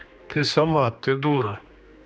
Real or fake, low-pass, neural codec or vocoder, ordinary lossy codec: fake; none; codec, 16 kHz, 2 kbps, X-Codec, HuBERT features, trained on general audio; none